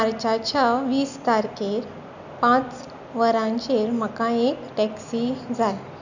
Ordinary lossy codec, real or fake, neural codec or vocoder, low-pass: none; real; none; 7.2 kHz